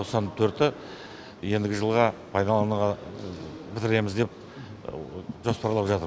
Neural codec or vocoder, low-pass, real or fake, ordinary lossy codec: none; none; real; none